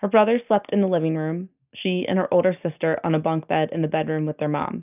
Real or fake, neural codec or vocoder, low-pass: real; none; 3.6 kHz